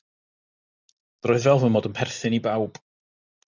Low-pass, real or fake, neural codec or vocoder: 7.2 kHz; real; none